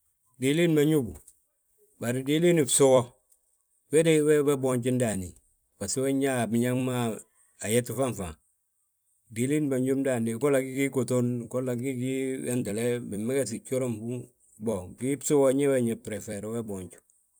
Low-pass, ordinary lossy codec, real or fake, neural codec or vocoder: none; none; fake; vocoder, 44.1 kHz, 128 mel bands every 256 samples, BigVGAN v2